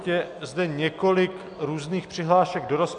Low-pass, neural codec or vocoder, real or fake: 9.9 kHz; none; real